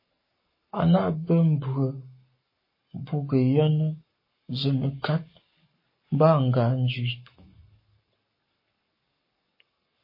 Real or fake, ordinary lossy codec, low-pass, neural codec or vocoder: fake; MP3, 24 kbps; 5.4 kHz; codec, 44.1 kHz, 7.8 kbps, Pupu-Codec